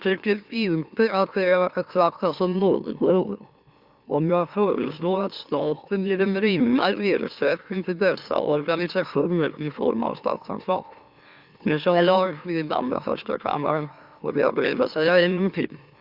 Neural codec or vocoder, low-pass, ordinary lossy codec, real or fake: autoencoder, 44.1 kHz, a latent of 192 numbers a frame, MeloTTS; 5.4 kHz; Opus, 64 kbps; fake